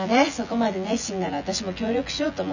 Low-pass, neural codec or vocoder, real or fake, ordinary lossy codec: 7.2 kHz; vocoder, 24 kHz, 100 mel bands, Vocos; fake; none